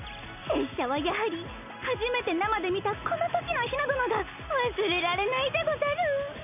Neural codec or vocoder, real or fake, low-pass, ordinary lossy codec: none; real; 3.6 kHz; none